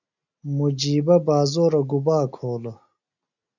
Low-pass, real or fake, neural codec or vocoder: 7.2 kHz; real; none